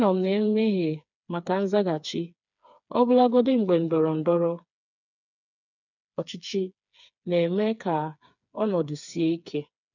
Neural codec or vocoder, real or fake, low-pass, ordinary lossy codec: codec, 16 kHz, 4 kbps, FreqCodec, smaller model; fake; 7.2 kHz; none